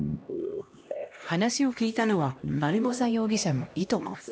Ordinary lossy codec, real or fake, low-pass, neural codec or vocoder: none; fake; none; codec, 16 kHz, 1 kbps, X-Codec, HuBERT features, trained on LibriSpeech